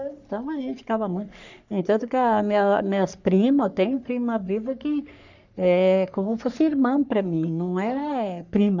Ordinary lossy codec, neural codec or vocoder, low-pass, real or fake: none; codec, 44.1 kHz, 3.4 kbps, Pupu-Codec; 7.2 kHz; fake